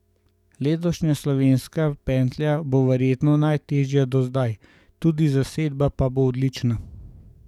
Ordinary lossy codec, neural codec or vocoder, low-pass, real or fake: none; codec, 44.1 kHz, 7.8 kbps, DAC; 19.8 kHz; fake